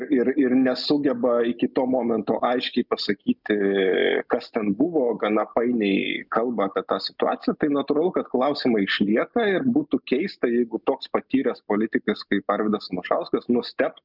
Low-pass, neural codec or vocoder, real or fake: 5.4 kHz; none; real